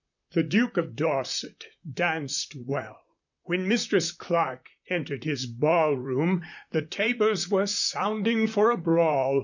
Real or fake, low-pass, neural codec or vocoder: fake; 7.2 kHz; vocoder, 44.1 kHz, 128 mel bands, Pupu-Vocoder